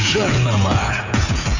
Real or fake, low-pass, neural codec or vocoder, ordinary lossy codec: fake; 7.2 kHz; codec, 16 kHz, 16 kbps, FreqCodec, smaller model; none